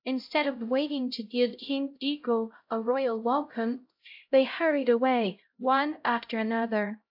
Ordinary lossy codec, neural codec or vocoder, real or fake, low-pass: AAC, 48 kbps; codec, 16 kHz, 0.5 kbps, X-Codec, HuBERT features, trained on LibriSpeech; fake; 5.4 kHz